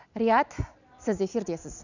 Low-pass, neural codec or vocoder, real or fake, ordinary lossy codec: 7.2 kHz; none; real; none